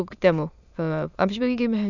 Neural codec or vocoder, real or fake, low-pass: autoencoder, 22.05 kHz, a latent of 192 numbers a frame, VITS, trained on many speakers; fake; 7.2 kHz